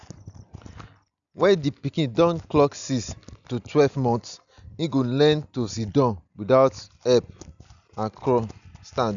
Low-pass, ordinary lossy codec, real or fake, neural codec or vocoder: 7.2 kHz; none; real; none